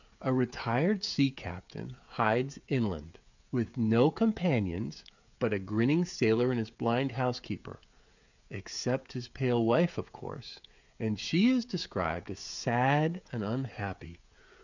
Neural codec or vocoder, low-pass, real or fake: codec, 16 kHz, 16 kbps, FreqCodec, smaller model; 7.2 kHz; fake